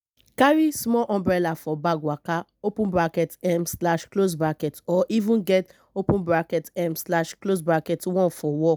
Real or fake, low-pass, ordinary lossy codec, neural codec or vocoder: real; none; none; none